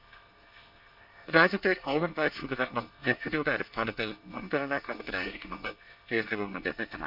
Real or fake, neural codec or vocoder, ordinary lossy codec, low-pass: fake; codec, 24 kHz, 1 kbps, SNAC; none; 5.4 kHz